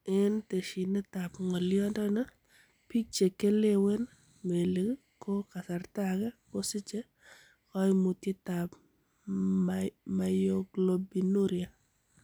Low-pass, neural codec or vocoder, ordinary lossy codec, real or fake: none; none; none; real